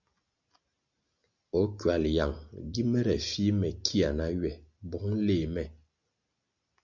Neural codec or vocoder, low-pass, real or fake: none; 7.2 kHz; real